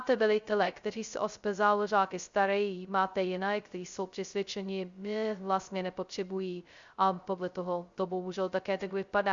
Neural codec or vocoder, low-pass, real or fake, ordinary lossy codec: codec, 16 kHz, 0.2 kbps, FocalCodec; 7.2 kHz; fake; Opus, 64 kbps